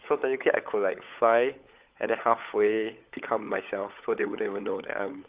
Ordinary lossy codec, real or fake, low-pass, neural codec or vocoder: Opus, 32 kbps; fake; 3.6 kHz; codec, 16 kHz, 16 kbps, FunCodec, trained on LibriTTS, 50 frames a second